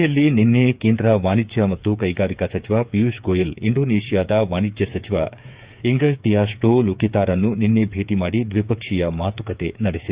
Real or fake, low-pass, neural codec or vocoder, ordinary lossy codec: fake; 3.6 kHz; codec, 16 kHz, 8 kbps, FreqCodec, smaller model; Opus, 64 kbps